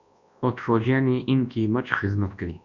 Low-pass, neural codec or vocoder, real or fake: 7.2 kHz; codec, 24 kHz, 0.9 kbps, WavTokenizer, large speech release; fake